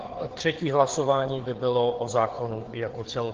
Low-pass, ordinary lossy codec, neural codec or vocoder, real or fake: 7.2 kHz; Opus, 16 kbps; codec, 16 kHz, 4 kbps, FreqCodec, larger model; fake